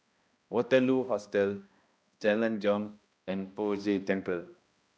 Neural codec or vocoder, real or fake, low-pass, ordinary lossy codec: codec, 16 kHz, 1 kbps, X-Codec, HuBERT features, trained on balanced general audio; fake; none; none